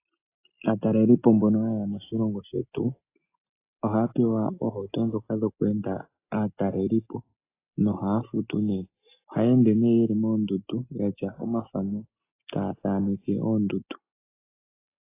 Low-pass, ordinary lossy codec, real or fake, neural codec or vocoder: 3.6 kHz; AAC, 24 kbps; real; none